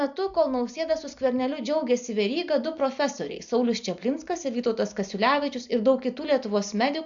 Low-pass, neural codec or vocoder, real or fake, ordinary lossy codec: 7.2 kHz; none; real; AAC, 64 kbps